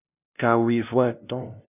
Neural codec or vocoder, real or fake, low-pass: codec, 16 kHz, 0.5 kbps, FunCodec, trained on LibriTTS, 25 frames a second; fake; 3.6 kHz